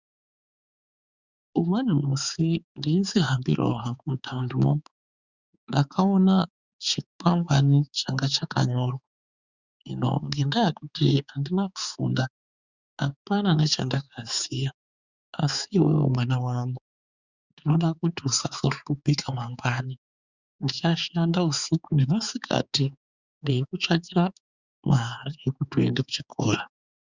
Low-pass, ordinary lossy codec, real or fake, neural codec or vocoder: 7.2 kHz; Opus, 64 kbps; fake; codec, 16 kHz, 4 kbps, X-Codec, HuBERT features, trained on balanced general audio